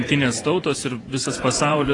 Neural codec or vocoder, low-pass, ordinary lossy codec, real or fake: vocoder, 24 kHz, 100 mel bands, Vocos; 10.8 kHz; AAC, 32 kbps; fake